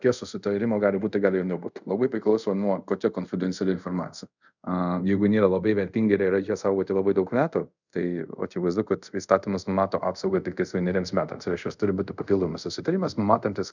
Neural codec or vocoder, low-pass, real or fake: codec, 24 kHz, 0.5 kbps, DualCodec; 7.2 kHz; fake